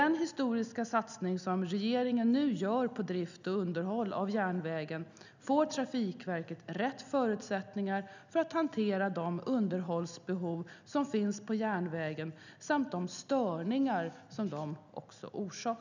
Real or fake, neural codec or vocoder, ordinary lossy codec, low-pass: real; none; none; 7.2 kHz